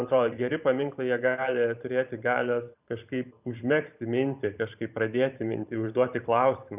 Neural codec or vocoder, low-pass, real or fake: vocoder, 44.1 kHz, 128 mel bands every 512 samples, BigVGAN v2; 3.6 kHz; fake